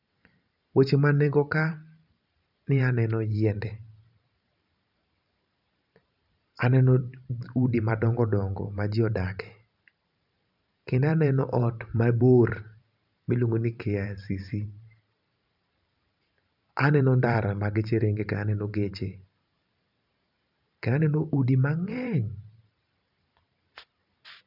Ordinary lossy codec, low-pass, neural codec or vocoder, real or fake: none; 5.4 kHz; vocoder, 44.1 kHz, 128 mel bands every 512 samples, BigVGAN v2; fake